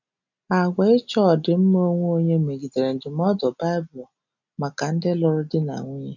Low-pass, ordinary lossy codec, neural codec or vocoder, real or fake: 7.2 kHz; none; none; real